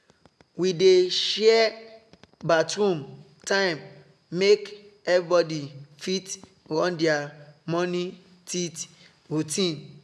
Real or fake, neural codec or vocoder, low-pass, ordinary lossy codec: real; none; none; none